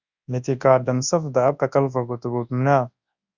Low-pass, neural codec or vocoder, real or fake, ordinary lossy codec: 7.2 kHz; codec, 24 kHz, 0.9 kbps, WavTokenizer, large speech release; fake; Opus, 64 kbps